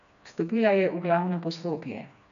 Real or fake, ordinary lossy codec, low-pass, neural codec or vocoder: fake; none; 7.2 kHz; codec, 16 kHz, 2 kbps, FreqCodec, smaller model